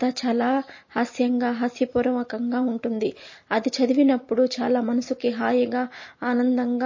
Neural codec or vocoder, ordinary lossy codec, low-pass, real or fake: none; MP3, 32 kbps; 7.2 kHz; real